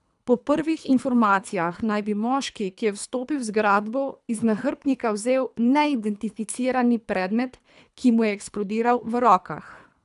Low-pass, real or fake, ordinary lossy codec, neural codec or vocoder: 10.8 kHz; fake; none; codec, 24 kHz, 3 kbps, HILCodec